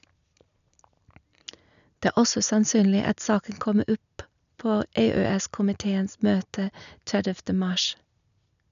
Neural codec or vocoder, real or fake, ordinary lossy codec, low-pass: none; real; none; 7.2 kHz